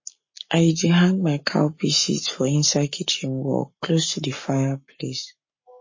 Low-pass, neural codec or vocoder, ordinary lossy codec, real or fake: 7.2 kHz; codec, 44.1 kHz, 7.8 kbps, Pupu-Codec; MP3, 32 kbps; fake